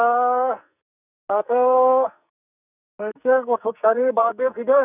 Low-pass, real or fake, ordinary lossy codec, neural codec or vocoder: 3.6 kHz; fake; none; codec, 44.1 kHz, 2.6 kbps, SNAC